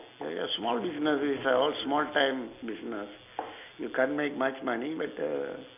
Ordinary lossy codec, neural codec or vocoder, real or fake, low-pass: none; none; real; 3.6 kHz